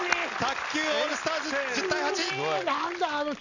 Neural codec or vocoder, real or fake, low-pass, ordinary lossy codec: none; real; 7.2 kHz; none